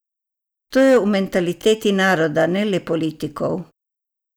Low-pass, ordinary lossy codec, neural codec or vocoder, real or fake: none; none; none; real